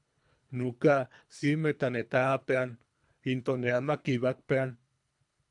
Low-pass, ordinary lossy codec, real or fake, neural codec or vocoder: 10.8 kHz; AAC, 64 kbps; fake; codec, 24 kHz, 3 kbps, HILCodec